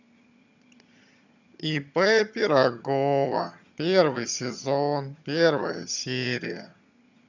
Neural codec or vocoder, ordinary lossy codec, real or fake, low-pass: vocoder, 22.05 kHz, 80 mel bands, HiFi-GAN; none; fake; 7.2 kHz